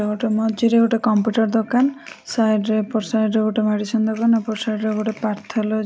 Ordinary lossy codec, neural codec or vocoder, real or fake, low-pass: none; none; real; none